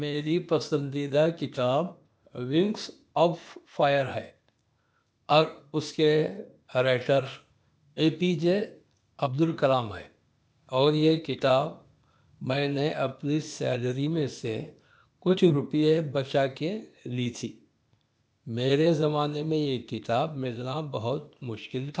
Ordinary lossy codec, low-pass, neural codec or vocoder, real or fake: none; none; codec, 16 kHz, 0.8 kbps, ZipCodec; fake